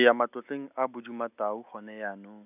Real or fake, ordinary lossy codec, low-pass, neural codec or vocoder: real; none; 3.6 kHz; none